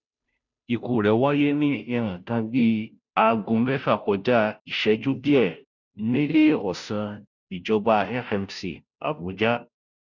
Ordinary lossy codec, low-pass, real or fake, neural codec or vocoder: none; 7.2 kHz; fake; codec, 16 kHz, 0.5 kbps, FunCodec, trained on Chinese and English, 25 frames a second